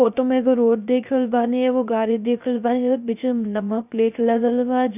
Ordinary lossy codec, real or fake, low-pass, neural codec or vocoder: none; fake; 3.6 kHz; codec, 16 kHz, 0.3 kbps, FocalCodec